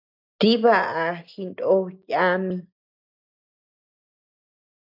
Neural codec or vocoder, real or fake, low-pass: none; real; 5.4 kHz